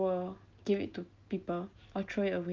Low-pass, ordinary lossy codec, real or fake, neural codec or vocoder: 7.2 kHz; Opus, 32 kbps; real; none